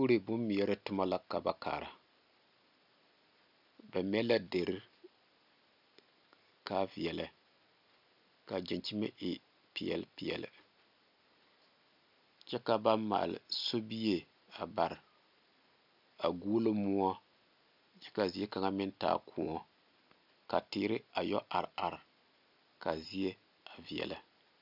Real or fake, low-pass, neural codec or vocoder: real; 5.4 kHz; none